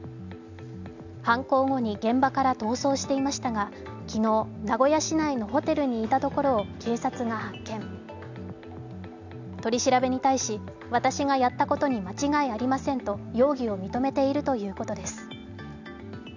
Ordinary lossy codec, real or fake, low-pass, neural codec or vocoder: none; real; 7.2 kHz; none